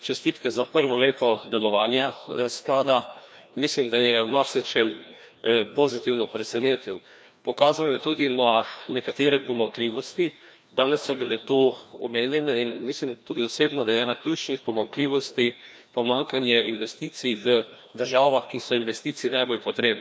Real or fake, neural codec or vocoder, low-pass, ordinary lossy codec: fake; codec, 16 kHz, 1 kbps, FreqCodec, larger model; none; none